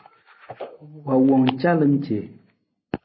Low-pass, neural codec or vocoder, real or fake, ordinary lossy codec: 7.2 kHz; none; real; MP3, 24 kbps